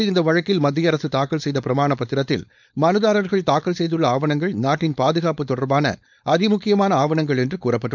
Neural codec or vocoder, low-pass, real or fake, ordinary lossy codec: codec, 16 kHz, 4.8 kbps, FACodec; 7.2 kHz; fake; none